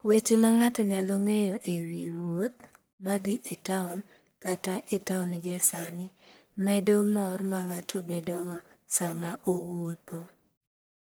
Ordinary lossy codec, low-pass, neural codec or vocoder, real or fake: none; none; codec, 44.1 kHz, 1.7 kbps, Pupu-Codec; fake